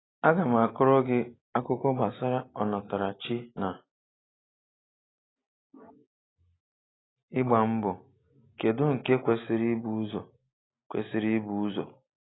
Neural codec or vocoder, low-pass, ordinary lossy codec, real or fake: none; 7.2 kHz; AAC, 16 kbps; real